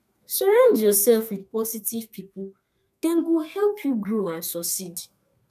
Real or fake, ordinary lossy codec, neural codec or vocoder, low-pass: fake; none; codec, 32 kHz, 1.9 kbps, SNAC; 14.4 kHz